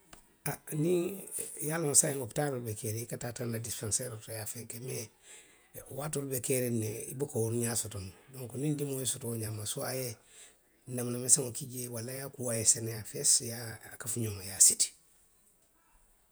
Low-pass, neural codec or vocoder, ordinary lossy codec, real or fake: none; vocoder, 48 kHz, 128 mel bands, Vocos; none; fake